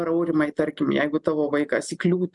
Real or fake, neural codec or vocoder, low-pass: real; none; 10.8 kHz